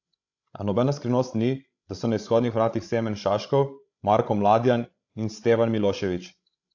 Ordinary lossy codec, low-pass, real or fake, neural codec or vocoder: AAC, 48 kbps; 7.2 kHz; fake; codec, 16 kHz, 16 kbps, FreqCodec, larger model